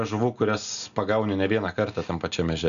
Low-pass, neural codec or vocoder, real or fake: 7.2 kHz; none; real